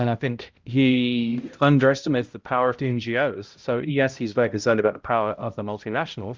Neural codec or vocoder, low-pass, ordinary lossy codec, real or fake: codec, 16 kHz, 0.5 kbps, X-Codec, HuBERT features, trained on balanced general audio; 7.2 kHz; Opus, 32 kbps; fake